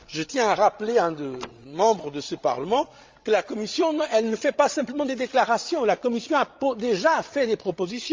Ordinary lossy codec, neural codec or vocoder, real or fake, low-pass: Opus, 32 kbps; codec, 16 kHz, 16 kbps, FreqCodec, larger model; fake; 7.2 kHz